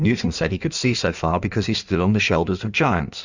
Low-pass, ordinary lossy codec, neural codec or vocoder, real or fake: 7.2 kHz; Opus, 64 kbps; codec, 16 kHz in and 24 kHz out, 1.1 kbps, FireRedTTS-2 codec; fake